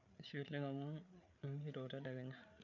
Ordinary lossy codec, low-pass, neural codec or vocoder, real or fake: none; 7.2 kHz; codec, 16 kHz, 8 kbps, FreqCodec, larger model; fake